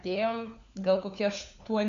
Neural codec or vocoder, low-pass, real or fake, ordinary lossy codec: codec, 16 kHz, 4 kbps, FunCodec, trained on LibriTTS, 50 frames a second; 7.2 kHz; fake; MP3, 64 kbps